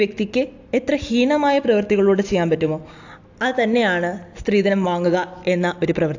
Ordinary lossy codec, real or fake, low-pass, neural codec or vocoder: AAC, 48 kbps; real; 7.2 kHz; none